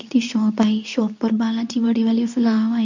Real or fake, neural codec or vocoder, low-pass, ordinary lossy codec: fake; codec, 24 kHz, 0.9 kbps, WavTokenizer, medium speech release version 2; 7.2 kHz; none